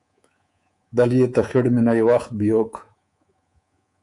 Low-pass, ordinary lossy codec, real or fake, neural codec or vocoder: 10.8 kHz; AAC, 64 kbps; fake; codec, 24 kHz, 3.1 kbps, DualCodec